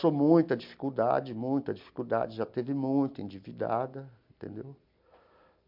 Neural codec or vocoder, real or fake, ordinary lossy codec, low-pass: none; real; MP3, 48 kbps; 5.4 kHz